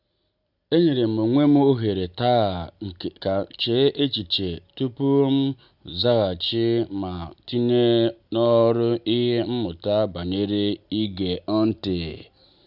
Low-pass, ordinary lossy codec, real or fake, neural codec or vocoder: 5.4 kHz; none; real; none